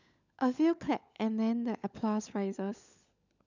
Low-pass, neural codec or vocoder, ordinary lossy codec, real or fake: 7.2 kHz; codec, 16 kHz, 16 kbps, FunCodec, trained on LibriTTS, 50 frames a second; none; fake